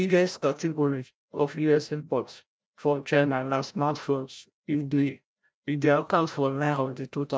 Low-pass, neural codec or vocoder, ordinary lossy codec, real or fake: none; codec, 16 kHz, 0.5 kbps, FreqCodec, larger model; none; fake